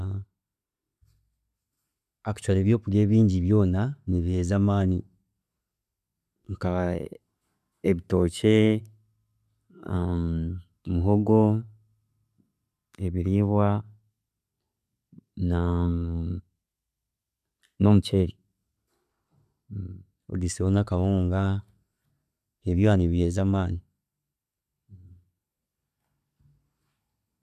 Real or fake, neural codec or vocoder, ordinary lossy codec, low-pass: real; none; none; 14.4 kHz